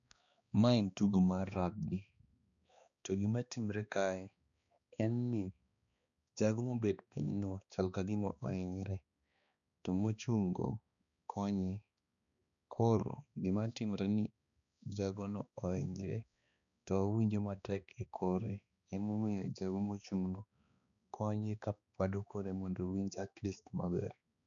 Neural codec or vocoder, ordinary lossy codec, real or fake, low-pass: codec, 16 kHz, 2 kbps, X-Codec, HuBERT features, trained on balanced general audio; none; fake; 7.2 kHz